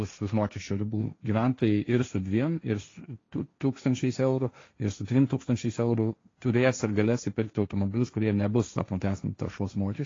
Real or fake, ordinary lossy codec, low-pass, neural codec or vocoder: fake; AAC, 32 kbps; 7.2 kHz; codec, 16 kHz, 1.1 kbps, Voila-Tokenizer